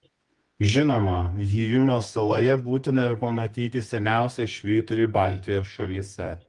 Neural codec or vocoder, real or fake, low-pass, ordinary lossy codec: codec, 24 kHz, 0.9 kbps, WavTokenizer, medium music audio release; fake; 10.8 kHz; Opus, 32 kbps